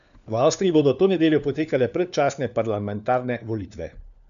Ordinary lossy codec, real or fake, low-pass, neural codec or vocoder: none; fake; 7.2 kHz; codec, 16 kHz, 4 kbps, FunCodec, trained on LibriTTS, 50 frames a second